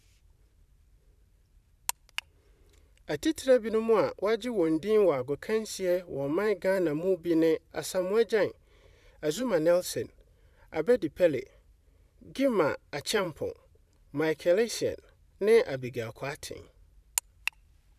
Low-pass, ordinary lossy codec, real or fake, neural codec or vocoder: 14.4 kHz; none; fake; vocoder, 44.1 kHz, 128 mel bands every 512 samples, BigVGAN v2